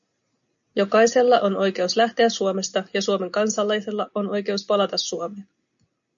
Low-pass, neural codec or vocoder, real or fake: 7.2 kHz; none; real